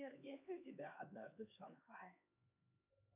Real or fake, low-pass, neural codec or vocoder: fake; 3.6 kHz; codec, 16 kHz, 2 kbps, X-Codec, HuBERT features, trained on LibriSpeech